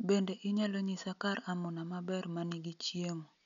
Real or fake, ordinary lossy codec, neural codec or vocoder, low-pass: real; MP3, 96 kbps; none; 7.2 kHz